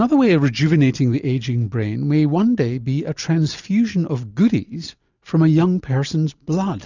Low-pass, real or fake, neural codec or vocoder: 7.2 kHz; real; none